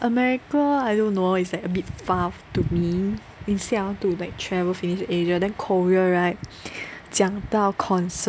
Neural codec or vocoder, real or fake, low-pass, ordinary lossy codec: none; real; none; none